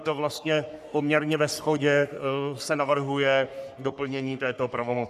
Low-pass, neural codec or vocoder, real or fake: 14.4 kHz; codec, 44.1 kHz, 3.4 kbps, Pupu-Codec; fake